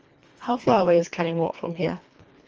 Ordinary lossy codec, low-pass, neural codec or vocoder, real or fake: Opus, 24 kbps; 7.2 kHz; codec, 24 kHz, 1.5 kbps, HILCodec; fake